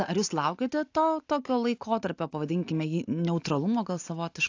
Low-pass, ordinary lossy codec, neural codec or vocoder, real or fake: 7.2 kHz; AAC, 48 kbps; none; real